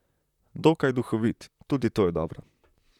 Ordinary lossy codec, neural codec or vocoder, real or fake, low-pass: none; vocoder, 44.1 kHz, 128 mel bands, Pupu-Vocoder; fake; 19.8 kHz